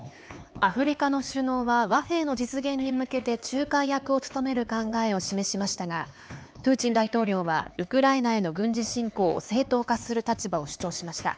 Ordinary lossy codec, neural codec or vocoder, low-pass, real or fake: none; codec, 16 kHz, 4 kbps, X-Codec, HuBERT features, trained on LibriSpeech; none; fake